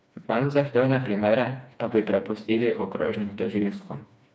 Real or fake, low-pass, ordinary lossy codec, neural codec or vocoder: fake; none; none; codec, 16 kHz, 2 kbps, FreqCodec, smaller model